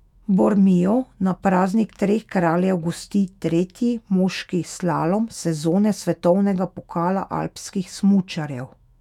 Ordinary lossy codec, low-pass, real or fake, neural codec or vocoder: none; 19.8 kHz; fake; autoencoder, 48 kHz, 128 numbers a frame, DAC-VAE, trained on Japanese speech